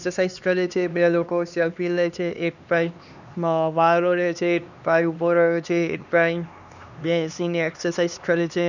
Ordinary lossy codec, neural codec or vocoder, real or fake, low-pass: none; codec, 16 kHz, 2 kbps, X-Codec, HuBERT features, trained on LibriSpeech; fake; 7.2 kHz